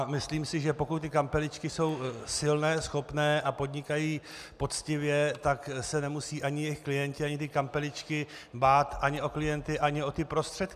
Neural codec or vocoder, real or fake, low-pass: none; real; 14.4 kHz